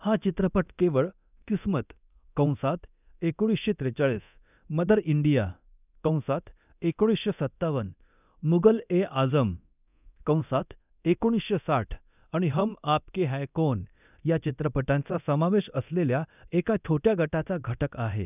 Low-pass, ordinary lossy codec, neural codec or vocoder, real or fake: 3.6 kHz; none; codec, 24 kHz, 0.9 kbps, DualCodec; fake